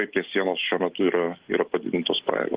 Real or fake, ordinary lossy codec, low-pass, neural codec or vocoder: real; Opus, 16 kbps; 3.6 kHz; none